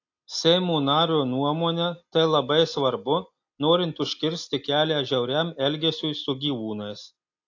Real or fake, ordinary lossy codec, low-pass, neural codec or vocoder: real; AAC, 48 kbps; 7.2 kHz; none